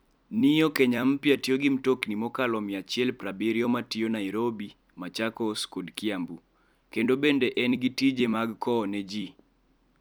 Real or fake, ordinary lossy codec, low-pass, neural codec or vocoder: fake; none; none; vocoder, 44.1 kHz, 128 mel bands every 256 samples, BigVGAN v2